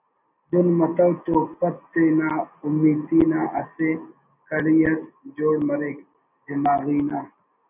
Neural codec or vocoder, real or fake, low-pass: none; real; 3.6 kHz